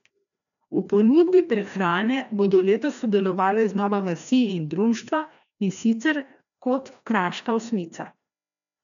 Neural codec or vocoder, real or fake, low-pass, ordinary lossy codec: codec, 16 kHz, 1 kbps, FreqCodec, larger model; fake; 7.2 kHz; none